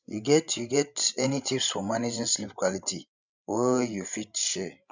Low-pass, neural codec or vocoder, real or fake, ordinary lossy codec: 7.2 kHz; codec, 16 kHz, 16 kbps, FreqCodec, larger model; fake; none